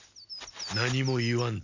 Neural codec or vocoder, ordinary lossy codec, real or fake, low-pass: none; none; real; 7.2 kHz